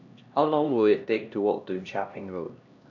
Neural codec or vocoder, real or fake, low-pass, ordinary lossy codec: codec, 16 kHz, 1 kbps, X-Codec, HuBERT features, trained on LibriSpeech; fake; 7.2 kHz; none